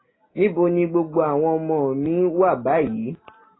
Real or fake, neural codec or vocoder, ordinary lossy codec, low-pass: real; none; AAC, 16 kbps; 7.2 kHz